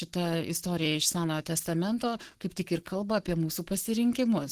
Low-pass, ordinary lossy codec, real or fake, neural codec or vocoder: 14.4 kHz; Opus, 16 kbps; fake; codec, 44.1 kHz, 7.8 kbps, Pupu-Codec